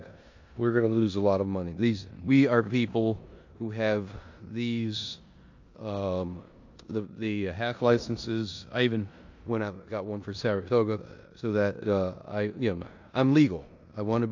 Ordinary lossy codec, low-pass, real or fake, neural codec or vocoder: AAC, 48 kbps; 7.2 kHz; fake; codec, 16 kHz in and 24 kHz out, 0.9 kbps, LongCat-Audio-Codec, four codebook decoder